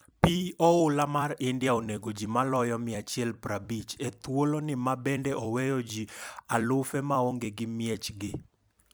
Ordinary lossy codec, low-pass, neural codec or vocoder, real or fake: none; none; vocoder, 44.1 kHz, 128 mel bands every 256 samples, BigVGAN v2; fake